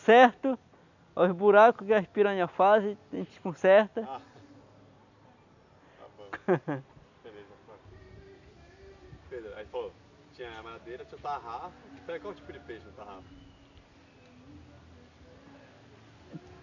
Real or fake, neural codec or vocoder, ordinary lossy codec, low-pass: real; none; none; 7.2 kHz